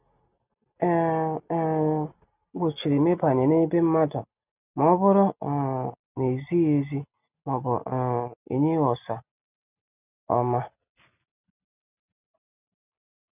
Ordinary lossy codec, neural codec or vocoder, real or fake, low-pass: none; none; real; 3.6 kHz